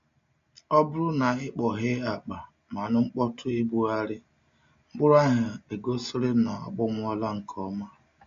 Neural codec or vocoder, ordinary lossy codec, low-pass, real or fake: none; MP3, 48 kbps; 7.2 kHz; real